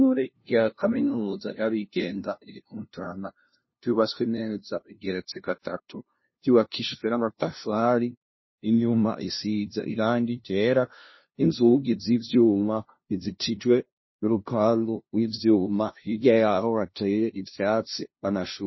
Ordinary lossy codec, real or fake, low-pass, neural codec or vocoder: MP3, 24 kbps; fake; 7.2 kHz; codec, 16 kHz, 0.5 kbps, FunCodec, trained on LibriTTS, 25 frames a second